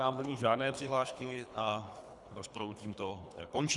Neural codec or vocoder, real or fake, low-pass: codec, 24 kHz, 3 kbps, HILCodec; fake; 10.8 kHz